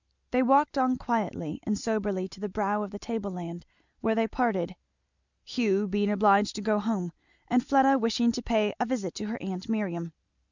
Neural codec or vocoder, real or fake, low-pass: none; real; 7.2 kHz